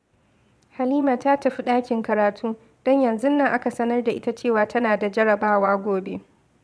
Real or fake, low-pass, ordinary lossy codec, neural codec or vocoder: fake; none; none; vocoder, 22.05 kHz, 80 mel bands, Vocos